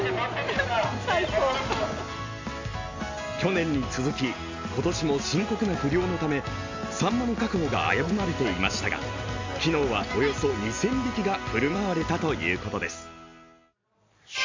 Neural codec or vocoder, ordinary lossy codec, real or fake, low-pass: none; MP3, 48 kbps; real; 7.2 kHz